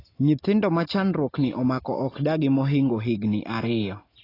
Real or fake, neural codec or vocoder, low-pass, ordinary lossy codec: real; none; 5.4 kHz; AAC, 24 kbps